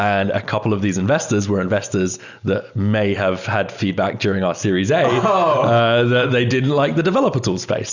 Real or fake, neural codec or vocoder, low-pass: real; none; 7.2 kHz